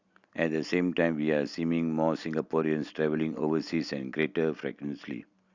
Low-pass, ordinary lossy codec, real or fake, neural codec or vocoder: 7.2 kHz; Opus, 64 kbps; real; none